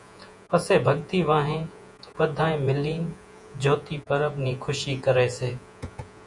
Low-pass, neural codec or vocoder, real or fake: 10.8 kHz; vocoder, 48 kHz, 128 mel bands, Vocos; fake